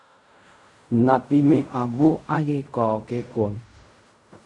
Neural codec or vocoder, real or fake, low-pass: codec, 16 kHz in and 24 kHz out, 0.4 kbps, LongCat-Audio-Codec, fine tuned four codebook decoder; fake; 10.8 kHz